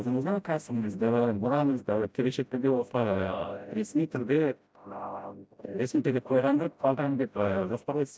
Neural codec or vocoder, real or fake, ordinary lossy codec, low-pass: codec, 16 kHz, 0.5 kbps, FreqCodec, smaller model; fake; none; none